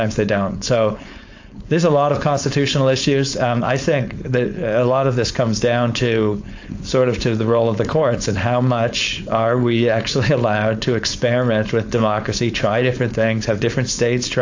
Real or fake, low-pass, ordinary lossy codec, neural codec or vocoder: fake; 7.2 kHz; AAC, 48 kbps; codec, 16 kHz, 4.8 kbps, FACodec